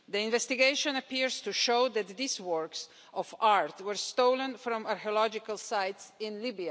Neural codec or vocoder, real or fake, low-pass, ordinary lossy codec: none; real; none; none